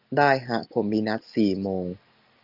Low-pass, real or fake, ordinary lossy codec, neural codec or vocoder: 5.4 kHz; real; Opus, 32 kbps; none